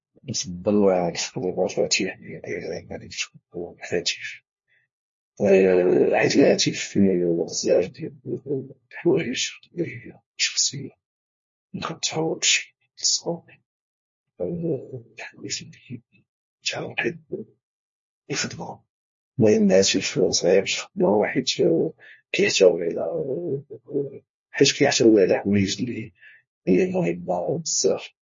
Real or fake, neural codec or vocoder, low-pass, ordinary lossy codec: fake; codec, 16 kHz, 1 kbps, FunCodec, trained on LibriTTS, 50 frames a second; 7.2 kHz; MP3, 32 kbps